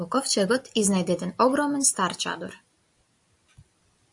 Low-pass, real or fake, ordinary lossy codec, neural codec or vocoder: 10.8 kHz; real; AAC, 64 kbps; none